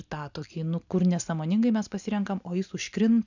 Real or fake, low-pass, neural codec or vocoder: real; 7.2 kHz; none